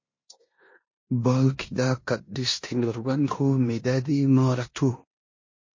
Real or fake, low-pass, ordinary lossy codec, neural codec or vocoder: fake; 7.2 kHz; MP3, 32 kbps; codec, 16 kHz in and 24 kHz out, 0.9 kbps, LongCat-Audio-Codec, four codebook decoder